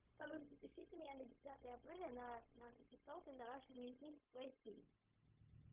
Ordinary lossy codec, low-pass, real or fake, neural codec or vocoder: Opus, 32 kbps; 3.6 kHz; fake; codec, 16 kHz, 0.4 kbps, LongCat-Audio-Codec